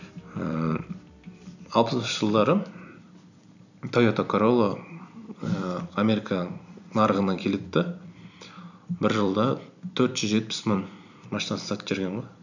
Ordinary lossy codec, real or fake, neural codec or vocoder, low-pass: none; fake; vocoder, 44.1 kHz, 128 mel bands every 256 samples, BigVGAN v2; 7.2 kHz